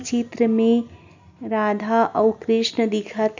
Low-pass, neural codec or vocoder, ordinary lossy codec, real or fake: 7.2 kHz; none; none; real